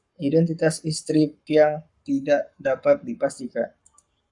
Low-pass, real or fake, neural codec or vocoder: 10.8 kHz; fake; codec, 44.1 kHz, 7.8 kbps, Pupu-Codec